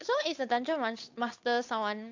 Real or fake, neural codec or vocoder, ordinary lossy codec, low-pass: fake; codec, 16 kHz, 8 kbps, FunCodec, trained on Chinese and English, 25 frames a second; AAC, 48 kbps; 7.2 kHz